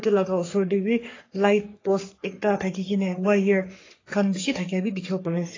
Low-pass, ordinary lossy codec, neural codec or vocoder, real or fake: 7.2 kHz; AAC, 32 kbps; codec, 44.1 kHz, 3.4 kbps, Pupu-Codec; fake